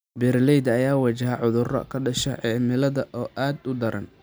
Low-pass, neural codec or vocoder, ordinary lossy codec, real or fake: none; none; none; real